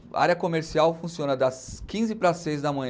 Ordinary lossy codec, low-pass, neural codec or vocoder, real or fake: none; none; none; real